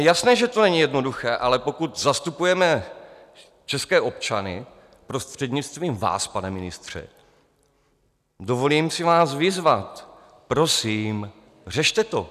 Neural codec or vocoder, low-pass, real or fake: none; 14.4 kHz; real